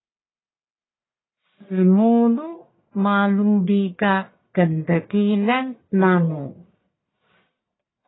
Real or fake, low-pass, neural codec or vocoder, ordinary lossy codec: fake; 7.2 kHz; codec, 44.1 kHz, 1.7 kbps, Pupu-Codec; AAC, 16 kbps